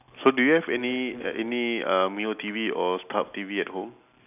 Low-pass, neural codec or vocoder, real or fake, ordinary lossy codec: 3.6 kHz; none; real; none